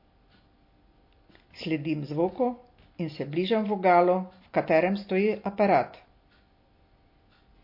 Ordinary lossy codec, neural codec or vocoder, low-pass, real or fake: MP3, 32 kbps; none; 5.4 kHz; real